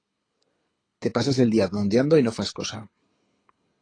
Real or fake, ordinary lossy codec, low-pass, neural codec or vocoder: fake; AAC, 32 kbps; 9.9 kHz; codec, 24 kHz, 6 kbps, HILCodec